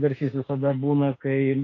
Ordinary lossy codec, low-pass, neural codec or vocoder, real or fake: AAC, 32 kbps; 7.2 kHz; autoencoder, 48 kHz, 32 numbers a frame, DAC-VAE, trained on Japanese speech; fake